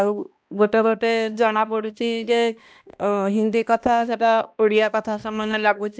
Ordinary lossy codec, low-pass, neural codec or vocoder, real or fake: none; none; codec, 16 kHz, 1 kbps, X-Codec, HuBERT features, trained on balanced general audio; fake